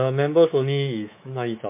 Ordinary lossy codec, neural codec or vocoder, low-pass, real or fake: MP3, 24 kbps; codec, 24 kHz, 3.1 kbps, DualCodec; 3.6 kHz; fake